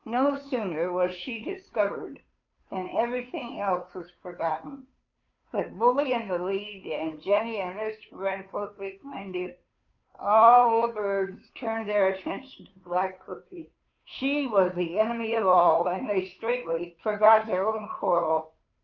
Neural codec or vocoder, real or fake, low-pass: codec, 16 kHz, 2 kbps, FunCodec, trained on Chinese and English, 25 frames a second; fake; 7.2 kHz